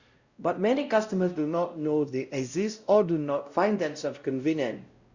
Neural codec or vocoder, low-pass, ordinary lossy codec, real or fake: codec, 16 kHz, 0.5 kbps, X-Codec, WavLM features, trained on Multilingual LibriSpeech; 7.2 kHz; Opus, 64 kbps; fake